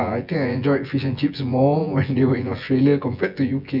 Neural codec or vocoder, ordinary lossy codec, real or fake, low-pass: vocoder, 24 kHz, 100 mel bands, Vocos; none; fake; 5.4 kHz